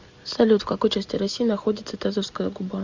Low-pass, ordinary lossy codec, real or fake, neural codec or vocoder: 7.2 kHz; Opus, 64 kbps; real; none